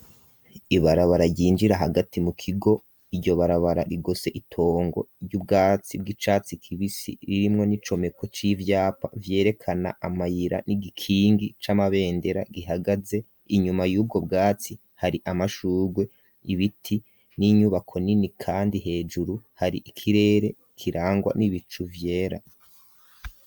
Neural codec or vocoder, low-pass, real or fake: none; 19.8 kHz; real